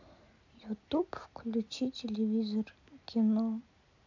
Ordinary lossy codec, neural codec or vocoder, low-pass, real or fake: MP3, 64 kbps; none; 7.2 kHz; real